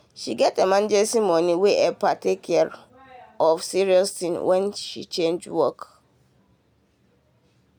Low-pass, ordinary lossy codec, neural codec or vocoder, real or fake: none; none; none; real